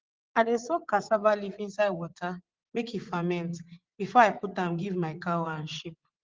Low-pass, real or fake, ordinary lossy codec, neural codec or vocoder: 7.2 kHz; fake; Opus, 16 kbps; vocoder, 44.1 kHz, 80 mel bands, Vocos